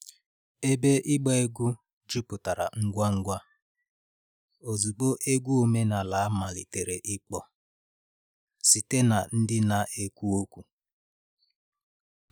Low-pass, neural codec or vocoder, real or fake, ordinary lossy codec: none; none; real; none